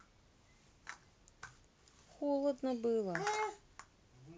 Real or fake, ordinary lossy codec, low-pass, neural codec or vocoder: real; none; none; none